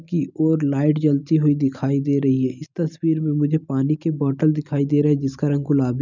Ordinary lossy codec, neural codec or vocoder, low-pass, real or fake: none; none; 7.2 kHz; real